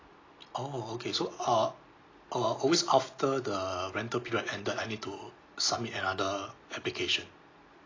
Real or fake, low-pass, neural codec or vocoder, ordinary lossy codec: real; 7.2 kHz; none; AAC, 32 kbps